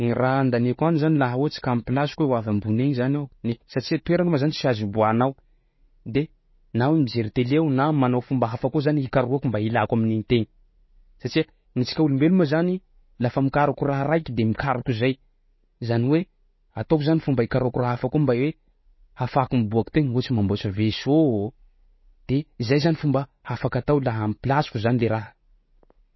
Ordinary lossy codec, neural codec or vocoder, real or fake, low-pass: MP3, 24 kbps; none; real; 7.2 kHz